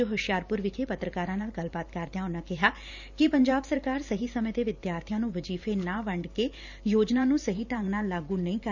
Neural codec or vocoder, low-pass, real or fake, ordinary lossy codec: vocoder, 44.1 kHz, 128 mel bands every 512 samples, BigVGAN v2; 7.2 kHz; fake; none